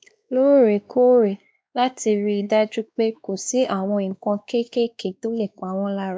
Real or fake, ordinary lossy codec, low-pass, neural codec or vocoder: fake; none; none; codec, 16 kHz, 2 kbps, X-Codec, WavLM features, trained on Multilingual LibriSpeech